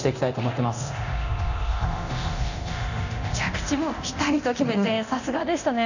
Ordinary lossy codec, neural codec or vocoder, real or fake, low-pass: none; codec, 24 kHz, 0.9 kbps, DualCodec; fake; 7.2 kHz